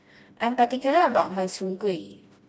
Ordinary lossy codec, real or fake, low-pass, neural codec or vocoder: none; fake; none; codec, 16 kHz, 1 kbps, FreqCodec, smaller model